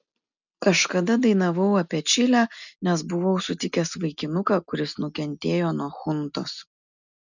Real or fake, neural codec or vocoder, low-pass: real; none; 7.2 kHz